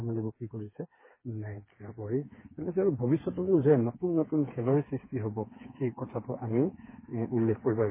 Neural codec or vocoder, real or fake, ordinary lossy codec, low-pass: codec, 16 kHz, 4 kbps, FreqCodec, smaller model; fake; MP3, 16 kbps; 3.6 kHz